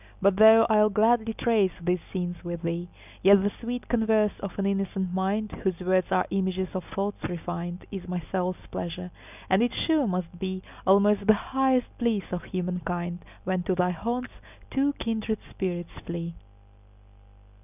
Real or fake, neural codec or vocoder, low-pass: real; none; 3.6 kHz